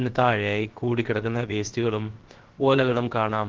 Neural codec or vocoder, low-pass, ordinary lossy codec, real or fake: codec, 16 kHz, about 1 kbps, DyCAST, with the encoder's durations; 7.2 kHz; Opus, 16 kbps; fake